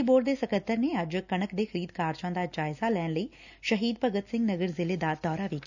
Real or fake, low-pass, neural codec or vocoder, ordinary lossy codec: real; 7.2 kHz; none; none